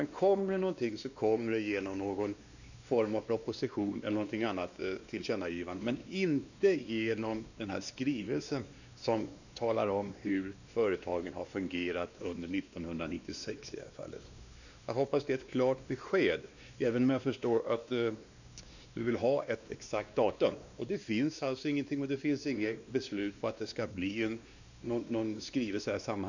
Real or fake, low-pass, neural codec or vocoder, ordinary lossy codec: fake; 7.2 kHz; codec, 16 kHz, 2 kbps, X-Codec, WavLM features, trained on Multilingual LibriSpeech; none